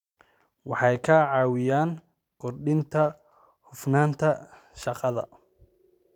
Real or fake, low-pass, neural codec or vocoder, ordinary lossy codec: fake; 19.8 kHz; vocoder, 44.1 kHz, 128 mel bands every 512 samples, BigVGAN v2; none